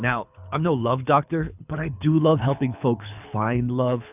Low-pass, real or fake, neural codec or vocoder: 3.6 kHz; fake; codec, 24 kHz, 6 kbps, HILCodec